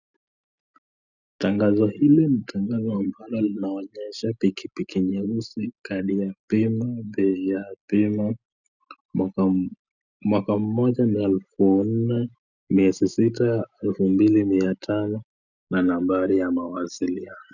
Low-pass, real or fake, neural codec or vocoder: 7.2 kHz; real; none